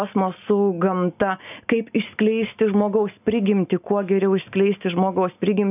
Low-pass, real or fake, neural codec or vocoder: 3.6 kHz; real; none